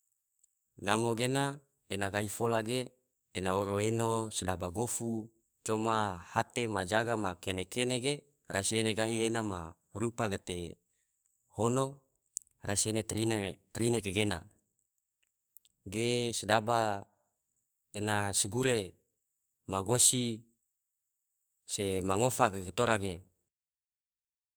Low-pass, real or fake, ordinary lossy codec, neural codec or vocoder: none; fake; none; codec, 44.1 kHz, 2.6 kbps, SNAC